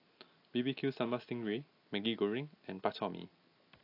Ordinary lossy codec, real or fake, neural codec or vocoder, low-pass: AAC, 32 kbps; real; none; 5.4 kHz